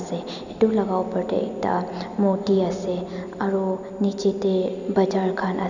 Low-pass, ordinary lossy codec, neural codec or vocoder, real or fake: 7.2 kHz; none; none; real